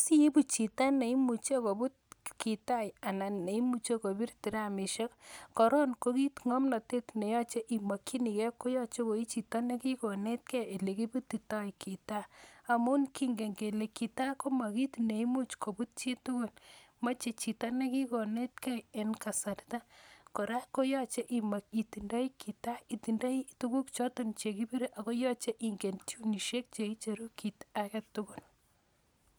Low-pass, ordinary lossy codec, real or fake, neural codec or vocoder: none; none; real; none